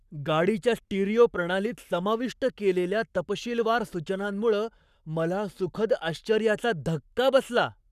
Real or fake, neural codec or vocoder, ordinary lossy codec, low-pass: fake; codec, 44.1 kHz, 7.8 kbps, Pupu-Codec; none; 14.4 kHz